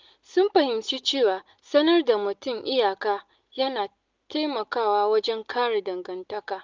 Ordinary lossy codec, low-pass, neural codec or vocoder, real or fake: Opus, 24 kbps; 7.2 kHz; none; real